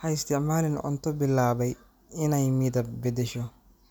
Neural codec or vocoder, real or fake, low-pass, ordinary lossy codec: none; real; none; none